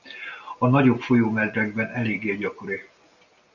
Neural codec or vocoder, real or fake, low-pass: none; real; 7.2 kHz